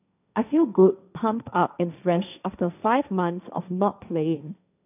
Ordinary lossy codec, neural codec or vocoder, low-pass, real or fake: none; codec, 16 kHz, 1.1 kbps, Voila-Tokenizer; 3.6 kHz; fake